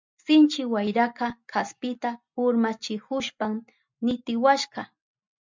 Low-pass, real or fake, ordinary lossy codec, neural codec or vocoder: 7.2 kHz; real; MP3, 64 kbps; none